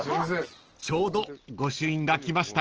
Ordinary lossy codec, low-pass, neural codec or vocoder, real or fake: Opus, 16 kbps; 7.2 kHz; none; real